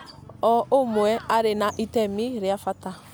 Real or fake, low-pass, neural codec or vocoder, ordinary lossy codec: real; none; none; none